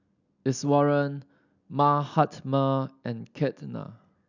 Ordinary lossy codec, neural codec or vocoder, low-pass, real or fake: none; none; 7.2 kHz; real